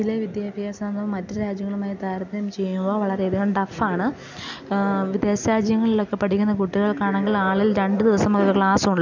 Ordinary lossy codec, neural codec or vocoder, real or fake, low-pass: none; none; real; 7.2 kHz